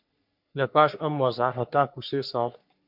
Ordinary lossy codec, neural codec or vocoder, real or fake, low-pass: MP3, 48 kbps; codec, 44.1 kHz, 3.4 kbps, Pupu-Codec; fake; 5.4 kHz